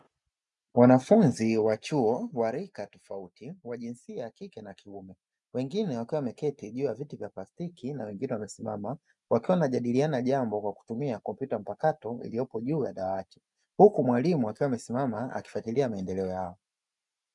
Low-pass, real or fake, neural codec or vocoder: 10.8 kHz; real; none